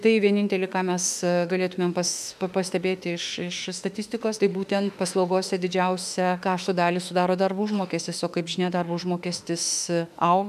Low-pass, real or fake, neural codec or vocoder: 14.4 kHz; fake; autoencoder, 48 kHz, 32 numbers a frame, DAC-VAE, trained on Japanese speech